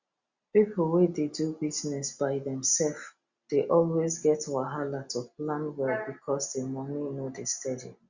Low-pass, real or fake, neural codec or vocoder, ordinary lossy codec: 7.2 kHz; real; none; Opus, 64 kbps